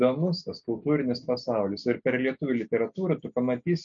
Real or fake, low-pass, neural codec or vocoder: real; 7.2 kHz; none